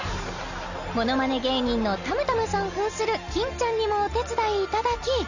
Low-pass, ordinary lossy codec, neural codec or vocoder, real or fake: 7.2 kHz; none; none; real